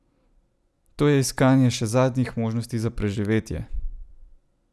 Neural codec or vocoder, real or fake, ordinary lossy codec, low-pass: none; real; none; none